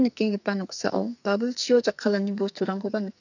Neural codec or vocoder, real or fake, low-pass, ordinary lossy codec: codec, 44.1 kHz, 2.6 kbps, SNAC; fake; 7.2 kHz; none